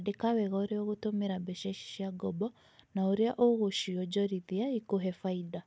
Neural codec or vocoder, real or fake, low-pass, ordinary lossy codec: none; real; none; none